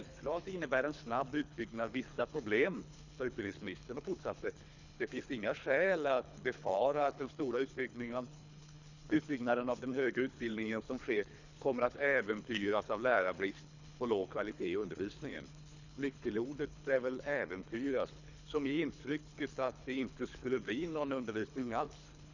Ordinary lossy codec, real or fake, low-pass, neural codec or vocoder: none; fake; 7.2 kHz; codec, 24 kHz, 3 kbps, HILCodec